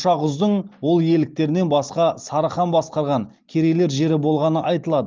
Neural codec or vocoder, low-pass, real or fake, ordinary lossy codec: none; 7.2 kHz; real; Opus, 32 kbps